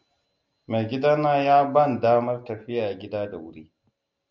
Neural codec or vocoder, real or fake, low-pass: none; real; 7.2 kHz